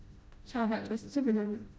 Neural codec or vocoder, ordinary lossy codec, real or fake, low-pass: codec, 16 kHz, 0.5 kbps, FreqCodec, smaller model; none; fake; none